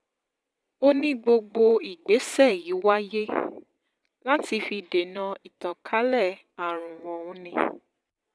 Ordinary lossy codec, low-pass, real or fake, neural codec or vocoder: none; none; fake; vocoder, 22.05 kHz, 80 mel bands, Vocos